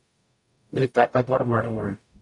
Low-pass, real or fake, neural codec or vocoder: 10.8 kHz; fake; codec, 44.1 kHz, 0.9 kbps, DAC